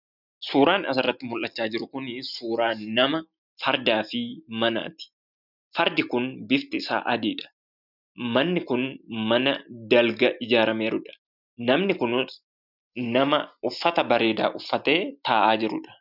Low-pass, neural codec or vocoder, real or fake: 5.4 kHz; none; real